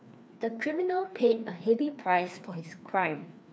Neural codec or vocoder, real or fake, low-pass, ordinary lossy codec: codec, 16 kHz, 2 kbps, FreqCodec, larger model; fake; none; none